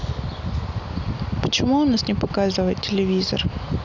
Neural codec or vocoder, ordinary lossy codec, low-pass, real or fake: none; none; 7.2 kHz; real